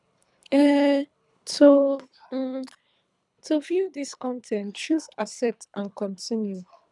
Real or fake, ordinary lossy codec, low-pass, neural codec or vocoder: fake; none; 10.8 kHz; codec, 24 kHz, 3 kbps, HILCodec